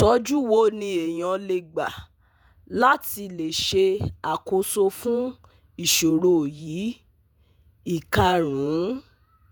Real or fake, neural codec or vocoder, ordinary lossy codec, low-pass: fake; vocoder, 48 kHz, 128 mel bands, Vocos; none; none